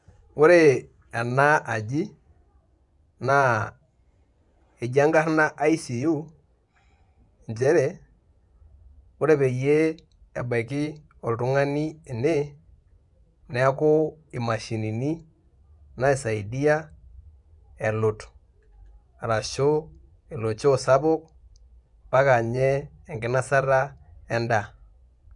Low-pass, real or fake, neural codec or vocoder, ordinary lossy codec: 10.8 kHz; fake; vocoder, 24 kHz, 100 mel bands, Vocos; none